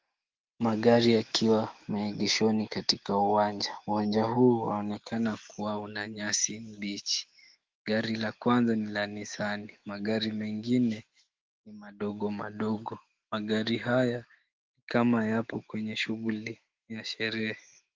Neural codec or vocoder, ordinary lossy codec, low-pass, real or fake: autoencoder, 48 kHz, 128 numbers a frame, DAC-VAE, trained on Japanese speech; Opus, 16 kbps; 7.2 kHz; fake